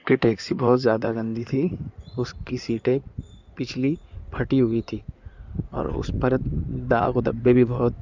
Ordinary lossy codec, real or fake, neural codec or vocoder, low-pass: none; fake; codec, 16 kHz in and 24 kHz out, 2.2 kbps, FireRedTTS-2 codec; 7.2 kHz